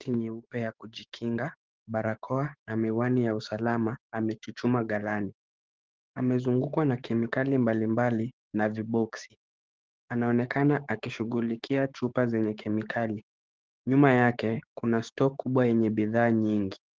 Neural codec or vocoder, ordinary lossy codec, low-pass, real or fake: codec, 16 kHz, 6 kbps, DAC; Opus, 16 kbps; 7.2 kHz; fake